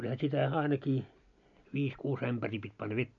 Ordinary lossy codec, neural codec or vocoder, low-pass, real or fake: AAC, 64 kbps; none; 7.2 kHz; real